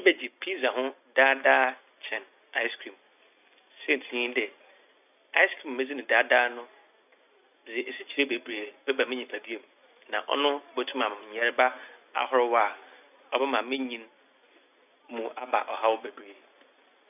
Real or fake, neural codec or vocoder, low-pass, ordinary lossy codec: real; none; 3.6 kHz; none